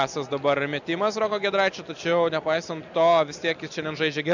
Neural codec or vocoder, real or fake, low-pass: none; real; 7.2 kHz